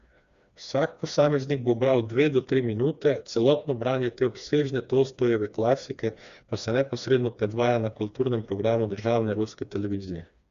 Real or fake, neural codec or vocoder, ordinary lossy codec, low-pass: fake; codec, 16 kHz, 2 kbps, FreqCodec, smaller model; Opus, 64 kbps; 7.2 kHz